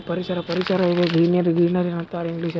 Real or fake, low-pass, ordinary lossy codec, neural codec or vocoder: real; none; none; none